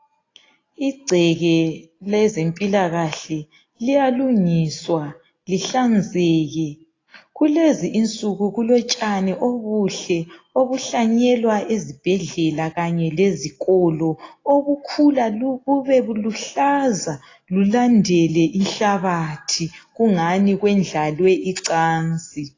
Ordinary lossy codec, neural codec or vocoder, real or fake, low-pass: AAC, 32 kbps; none; real; 7.2 kHz